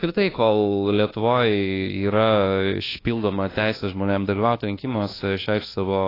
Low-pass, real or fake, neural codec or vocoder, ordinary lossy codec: 5.4 kHz; fake; codec, 24 kHz, 1.2 kbps, DualCodec; AAC, 24 kbps